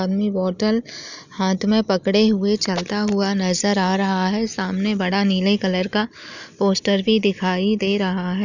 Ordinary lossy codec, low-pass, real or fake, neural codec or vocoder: none; 7.2 kHz; real; none